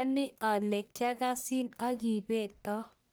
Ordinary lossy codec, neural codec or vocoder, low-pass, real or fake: none; codec, 44.1 kHz, 1.7 kbps, Pupu-Codec; none; fake